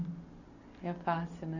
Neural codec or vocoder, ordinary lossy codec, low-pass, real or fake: none; none; 7.2 kHz; real